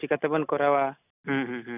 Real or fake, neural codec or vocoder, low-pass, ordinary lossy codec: real; none; 3.6 kHz; none